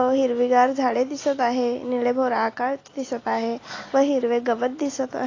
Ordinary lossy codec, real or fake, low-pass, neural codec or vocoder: AAC, 32 kbps; real; 7.2 kHz; none